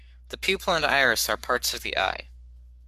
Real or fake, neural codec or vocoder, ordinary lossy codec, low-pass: fake; codec, 44.1 kHz, 7.8 kbps, Pupu-Codec; MP3, 96 kbps; 14.4 kHz